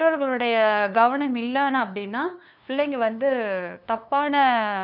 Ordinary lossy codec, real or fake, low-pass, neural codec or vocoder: none; fake; 5.4 kHz; codec, 16 kHz, 2 kbps, FunCodec, trained on LibriTTS, 25 frames a second